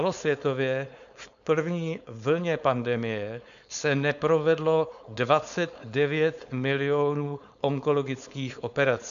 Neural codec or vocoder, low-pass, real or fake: codec, 16 kHz, 4.8 kbps, FACodec; 7.2 kHz; fake